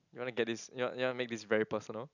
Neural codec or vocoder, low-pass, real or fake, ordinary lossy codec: none; 7.2 kHz; real; none